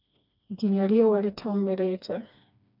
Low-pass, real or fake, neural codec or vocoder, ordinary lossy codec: 5.4 kHz; fake; codec, 16 kHz, 2 kbps, FreqCodec, smaller model; none